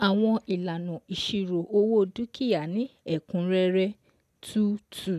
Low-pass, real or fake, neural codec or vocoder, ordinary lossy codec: 14.4 kHz; real; none; MP3, 96 kbps